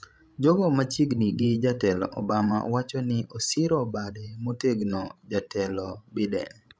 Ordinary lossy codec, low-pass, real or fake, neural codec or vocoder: none; none; fake; codec, 16 kHz, 16 kbps, FreqCodec, larger model